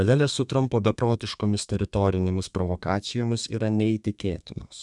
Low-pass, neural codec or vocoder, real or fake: 10.8 kHz; codec, 32 kHz, 1.9 kbps, SNAC; fake